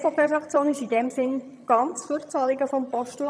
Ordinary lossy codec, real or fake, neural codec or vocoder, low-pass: none; fake; vocoder, 22.05 kHz, 80 mel bands, HiFi-GAN; none